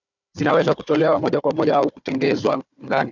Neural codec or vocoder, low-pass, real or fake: codec, 16 kHz, 4 kbps, FunCodec, trained on Chinese and English, 50 frames a second; 7.2 kHz; fake